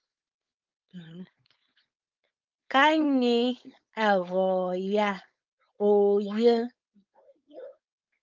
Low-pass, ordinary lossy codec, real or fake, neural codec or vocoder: 7.2 kHz; Opus, 24 kbps; fake; codec, 16 kHz, 4.8 kbps, FACodec